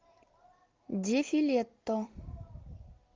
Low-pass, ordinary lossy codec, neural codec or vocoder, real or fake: 7.2 kHz; Opus, 32 kbps; none; real